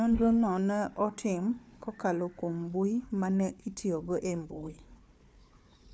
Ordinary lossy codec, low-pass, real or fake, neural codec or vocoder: none; none; fake; codec, 16 kHz, 4 kbps, FunCodec, trained on Chinese and English, 50 frames a second